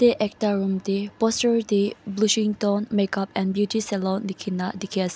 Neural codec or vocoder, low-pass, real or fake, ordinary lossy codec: none; none; real; none